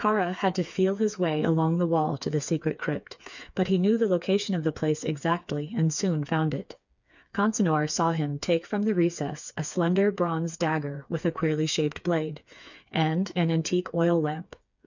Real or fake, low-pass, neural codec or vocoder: fake; 7.2 kHz; codec, 16 kHz, 4 kbps, FreqCodec, smaller model